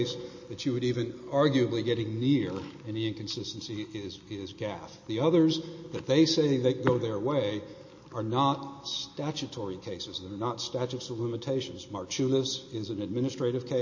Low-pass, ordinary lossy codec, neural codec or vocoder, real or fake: 7.2 kHz; MP3, 32 kbps; none; real